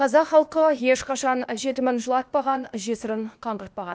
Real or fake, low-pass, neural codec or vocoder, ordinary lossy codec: fake; none; codec, 16 kHz, 0.8 kbps, ZipCodec; none